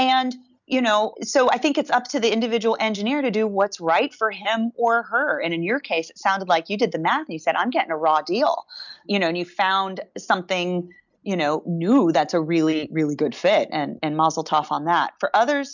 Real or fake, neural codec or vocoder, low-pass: real; none; 7.2 kHz